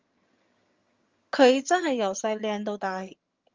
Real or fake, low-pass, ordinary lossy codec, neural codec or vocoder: fake; 7.2 kHz; Opus, 32 kbps; vocoder, 22.05 kHz, 80 mel bands, HiFi-GAN